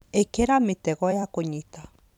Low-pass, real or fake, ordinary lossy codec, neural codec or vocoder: 19.8 kHz; fake; none; vocoder, 44.1 kHz, 128 mel bands every 256 samples, BigVGAN v2